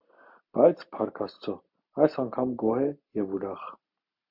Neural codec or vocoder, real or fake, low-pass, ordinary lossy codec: none; real; 5.4 kHz; MP3, 48 kbps